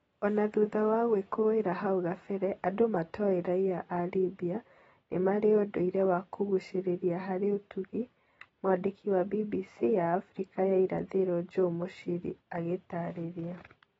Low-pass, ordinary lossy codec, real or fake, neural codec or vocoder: 19.8 kHz; AAC, 24 kbps; real; none